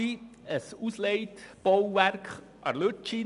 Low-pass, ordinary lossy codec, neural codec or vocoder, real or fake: 10.8 kHz; none; none; real